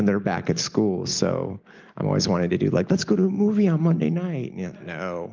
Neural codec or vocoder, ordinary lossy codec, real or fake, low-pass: none; Opus, 24 kbps; real; 7.2 kHz